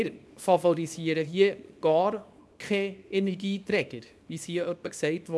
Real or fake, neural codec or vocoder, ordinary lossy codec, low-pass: fake; codec, 24 kHz, 0.9 kbps, WavTokenizer, small release; none; none